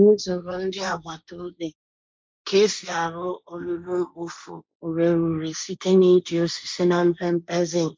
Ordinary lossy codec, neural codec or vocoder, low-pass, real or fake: none; codec, 16 kHz, 1.1 kbps, Voila-Tokenizer; none; fake